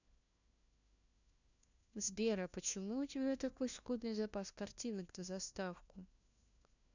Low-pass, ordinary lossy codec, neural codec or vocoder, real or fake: 7.2 kHz; none; codec, 16 kHz, 1 kbps, FunCodec, trained on LibriTTS, 50 frames a second; fake